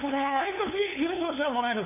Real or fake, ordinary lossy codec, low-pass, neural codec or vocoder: fake; none; 3.6 kHz; codec, 16 kHz, 2 kbps, FunCodec, trained on LibriTTS, 25 frames a second